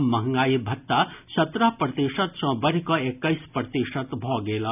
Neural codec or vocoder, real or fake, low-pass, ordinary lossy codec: none; real; 3.6 kHz; none